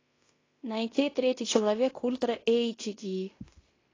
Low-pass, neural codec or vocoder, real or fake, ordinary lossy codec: 7.2 kHz; codec, 16 kHz in and 24 kHz out, 0.9 kbps, LongCat-Audio-Codec, fine tuned four codebook decoder; fake; AAC, 32 kbps